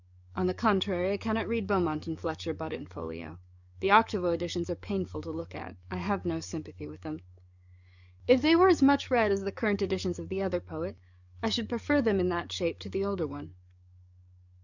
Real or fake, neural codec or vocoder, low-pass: fake; codec, 44.1 kHz, 7.8 kbps, DAC; 7.2 kHz